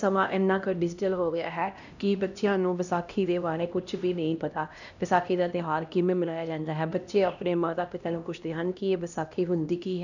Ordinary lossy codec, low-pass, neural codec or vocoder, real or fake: none; 7.2 kHz; codec, 16 kHz, 1 kbps, X-Codec, HuBERT features, trained on LibriSpeech; fake